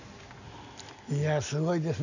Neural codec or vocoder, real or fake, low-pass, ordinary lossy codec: none; real; 7.2 kHz; none